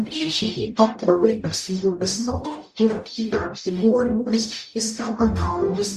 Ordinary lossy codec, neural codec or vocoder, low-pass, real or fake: Opus, 64 kbps; codec, 44.1 kHz, 0.9 kbps, DAC; 14.4 kHz; fake